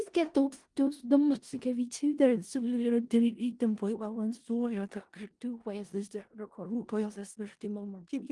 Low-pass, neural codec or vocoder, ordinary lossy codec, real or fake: 10.8 kHz; codec, 16 kHz in and 24 kHz out, 0.4 kbps, LongCat-Audio-Codec, four codebook decoder; Opus, 24 kbps; fake